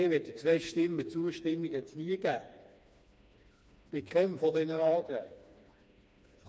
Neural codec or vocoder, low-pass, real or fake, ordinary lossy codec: codec, 16 kHz, 2 kbps, FreqCodec, smaller model; none; fake; none